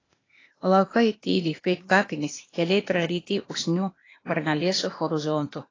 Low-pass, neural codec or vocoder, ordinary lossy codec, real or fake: 7.2 kHz; codec, 16 kHz, 0.8 kbps, ZipCodec; AAC, 32 kbps; fake